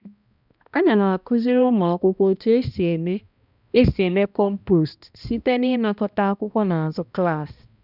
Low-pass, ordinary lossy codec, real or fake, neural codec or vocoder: 5.4 kHz; none; fake; codec, 16 kHz, 1 kbps, X-Codec, HuBERT features, trained on balanced general audio